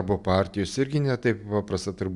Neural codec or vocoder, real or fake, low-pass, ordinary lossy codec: none; real; 10.8 kHz; MP3, 96 kbps